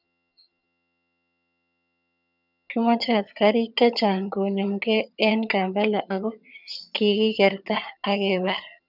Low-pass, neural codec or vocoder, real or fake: 5.4 kHz; vocoder, 22.05 kHz, 80 mel bands, HiFi-GAN; fake